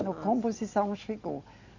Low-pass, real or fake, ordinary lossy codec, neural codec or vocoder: 7.2 kHz; real; none; none